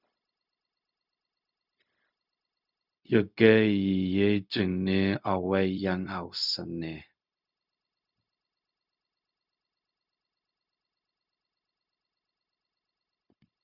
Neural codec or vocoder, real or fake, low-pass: codec, 16 kHz, 0.4 kbps, LongCat-Audio-Codec; fake; 5.4 kHz